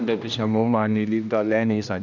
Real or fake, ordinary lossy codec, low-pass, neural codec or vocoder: fake; none; 7.2 kHz; codec, 16 kHz, 1 kbps, X-Codec, HuBERT features, trained on general audio